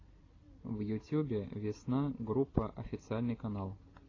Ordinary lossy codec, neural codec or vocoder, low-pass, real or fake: AAC, 32 kbps; none; 7.2 kHz; real